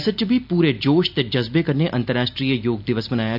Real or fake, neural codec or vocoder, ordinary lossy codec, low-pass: real; none; none; 5.4 kHz